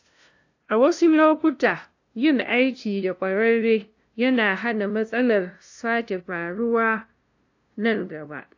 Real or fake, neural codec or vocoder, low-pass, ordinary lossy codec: fake; codec, 16 kHz, 0.5 kbps, FunCodec, trained on LibriTTS, 25 frames a second; 7.2 kHz; AAC, 48 kbps